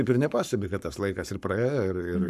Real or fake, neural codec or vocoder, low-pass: fake; codec, 44.1 kHz, 7.8 kbps, Pupu-Codec; 14.4 kHz